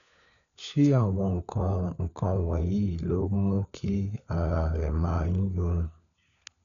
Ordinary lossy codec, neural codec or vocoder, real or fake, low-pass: none; codec, 16 kHz, 4 kbps, FunCodec, trained on LibriTTS, 50 frames a second; fake; 7.2 kHz